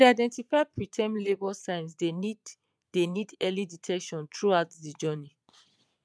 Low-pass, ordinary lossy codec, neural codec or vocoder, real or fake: none; none; vocoder, 22.05 kHz, 80 mel bands, Vocos; fake